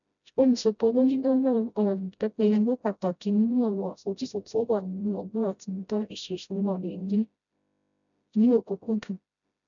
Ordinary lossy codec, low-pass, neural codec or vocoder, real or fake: none; 7.2 kHz; codec, 16 kHz, 0.5 kbps, FreqCodec, smaller model; fake